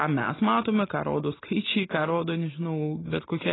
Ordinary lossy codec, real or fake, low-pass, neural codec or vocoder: AAC, 16 kbps; real; 7.2 kHz; none